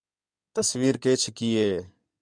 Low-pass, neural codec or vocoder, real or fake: 9.9 kHz; codec, 16 kHz in and 24 kHz out, 2.2 kbps, FireRedTTS-2 codec; fake